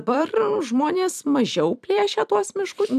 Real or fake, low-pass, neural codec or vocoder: fake; 14.4 kHz; vocoder, 44.1 kHz, 128 mel bands every 256 samples, BigVGAN v2